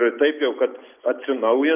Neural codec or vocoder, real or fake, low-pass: none; real; 3.6 kHz